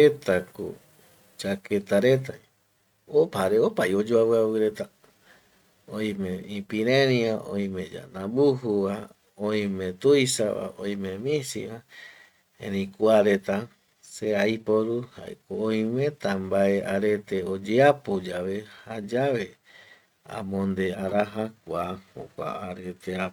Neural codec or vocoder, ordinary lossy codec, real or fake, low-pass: none; none; real; 19.8 kHz